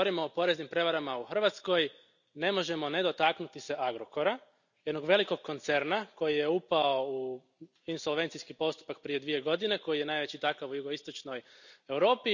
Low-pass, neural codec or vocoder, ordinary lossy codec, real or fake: 7.2 kHz; none; none; real